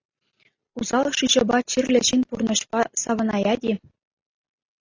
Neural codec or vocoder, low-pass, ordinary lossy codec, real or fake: none; 7.2 kHz; AAC, 32 kbps; real